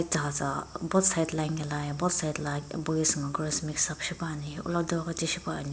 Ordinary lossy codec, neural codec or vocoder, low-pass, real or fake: none; none; none; real